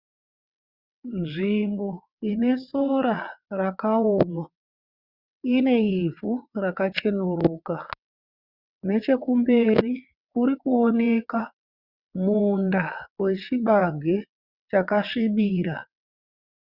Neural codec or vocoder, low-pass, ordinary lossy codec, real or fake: vocoder, 22.05 kHz, 80 mel bands, WaveNeXt; 5.4 kHz; Opus, 64 kbps; fake